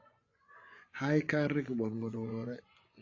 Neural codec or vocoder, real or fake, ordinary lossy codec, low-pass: vocoder, 24 kHz, 100 mel bands, Vocos; fake; AAC, 32 kbps; 7.2 kHz